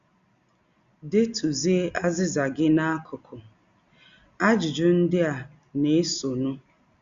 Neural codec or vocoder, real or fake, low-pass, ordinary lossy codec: none; real; 7.2 kHz; Opus, 64 kbps